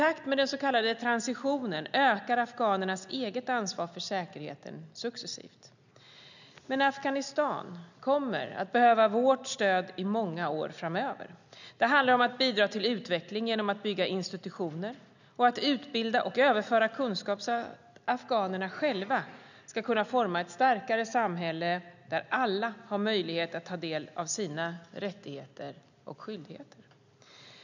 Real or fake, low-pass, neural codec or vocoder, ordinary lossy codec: real; 7.2 kHz; none; none